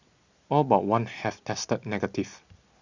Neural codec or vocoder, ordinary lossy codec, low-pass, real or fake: none; Opus, 64 kbps; 7.2 kHz; real